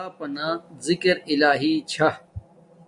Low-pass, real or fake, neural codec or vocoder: 10.8 kHz; real; none